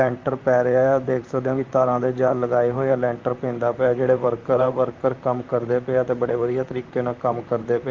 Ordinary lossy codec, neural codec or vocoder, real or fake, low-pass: Opus, 32 kbps; vocoder, 44.1 kHz, 128 mel bands, Pupu-Vocoder; fake; 7.2 kHz